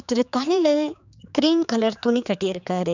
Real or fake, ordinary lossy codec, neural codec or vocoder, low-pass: fake; none; codec, 16 kHz, 4 kbps, X-Codec, HuBERT features, trained on general audio; 7.2 kHz